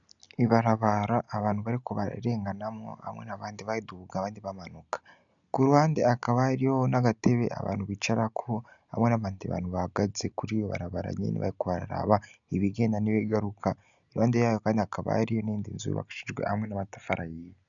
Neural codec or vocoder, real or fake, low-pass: none; real; 7.2 kHz